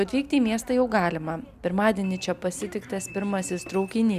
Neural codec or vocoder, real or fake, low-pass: none; real; 14.4 kHz